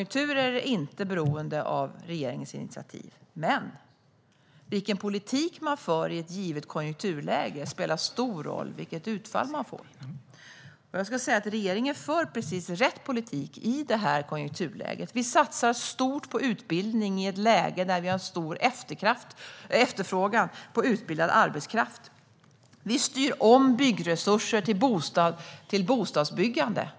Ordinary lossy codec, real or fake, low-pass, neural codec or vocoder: none; real; none; none